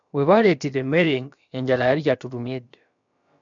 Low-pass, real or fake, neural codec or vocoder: 7.2 kHz; fake; codec, 16 kHz, about 1 kbps, DyCAST, with the encoder's durations